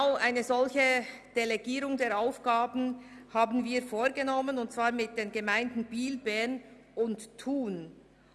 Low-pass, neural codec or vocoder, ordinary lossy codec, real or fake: none; none; none; real